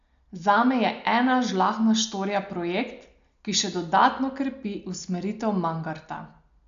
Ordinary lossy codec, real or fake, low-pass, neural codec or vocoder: MP3, 64 kbps; real; 7.2 kHz; none